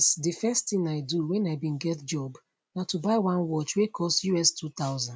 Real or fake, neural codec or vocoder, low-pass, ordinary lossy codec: real; none; none; none